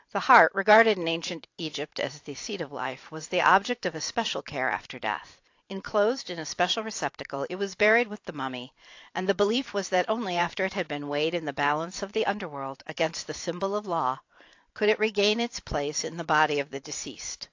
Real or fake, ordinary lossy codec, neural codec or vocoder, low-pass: fake; AAC, 48 kbps; vocoder, 44.1 kHz, 128 mel bands every 512 samples, BigVGAN v2; 7.2 kHz